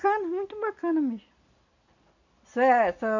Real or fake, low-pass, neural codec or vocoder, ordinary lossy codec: real; 7.2 kHz; none; none